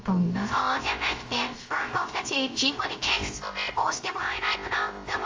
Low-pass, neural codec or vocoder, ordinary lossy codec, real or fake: 7.2 kHz; codec, 16 kHz, 0.3 kbps, FocalCodec; Opus, 32 kbps; fake